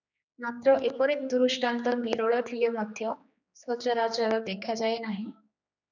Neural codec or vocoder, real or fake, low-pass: codec, 16 kHz, 2 kbps, X-Codec, HuBERT features, trained on general audio; fake; 7.2 kHz